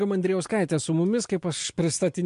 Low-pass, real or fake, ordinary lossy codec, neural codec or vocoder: 10.8 kHz; real; MP3, 64 kbps; none